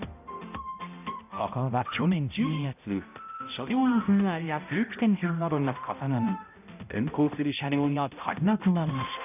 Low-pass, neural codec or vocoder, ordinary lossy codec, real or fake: 3.6 kHz; codec, 16 kHz, 0.5 kbps, X-Codec, HuBERT features, trained on balanced general audio; none; fake